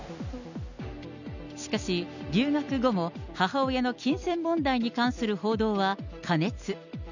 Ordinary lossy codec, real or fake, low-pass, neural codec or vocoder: none; real; 7.2 kHz; none